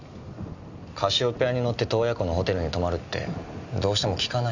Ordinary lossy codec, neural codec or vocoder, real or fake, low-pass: none; none; real; 7.2 kHz